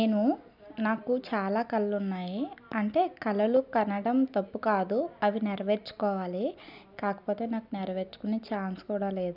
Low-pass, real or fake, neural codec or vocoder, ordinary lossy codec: 5.4 kHz; real; none; none